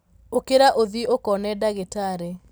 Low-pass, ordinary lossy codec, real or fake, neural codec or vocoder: none; none; real; none